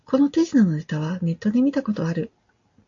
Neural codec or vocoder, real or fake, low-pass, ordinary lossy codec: none; real; 7.2 kHz; AAC, 64 kbps